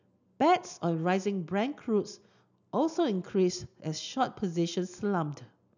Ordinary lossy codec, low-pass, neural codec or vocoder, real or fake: none; 7.2 kHz; none; real